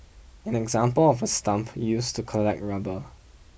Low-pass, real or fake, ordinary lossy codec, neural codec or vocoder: none; real; none; none